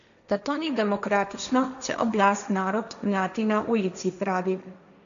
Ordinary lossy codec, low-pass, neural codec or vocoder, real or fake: none; 7.2 kHz; codec, 16 kHz, 1.1 kbps, Voila-Tokenizer; fake